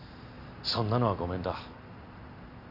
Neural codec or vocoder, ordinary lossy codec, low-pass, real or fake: none; none; 5.4 kHz; real